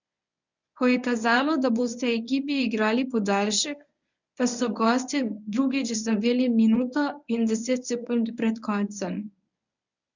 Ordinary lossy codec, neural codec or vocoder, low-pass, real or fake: none; codec, 24 kHz, 0.9 kbps, WavTokenizer, medium speech release version 1; 7.2 kHz; fake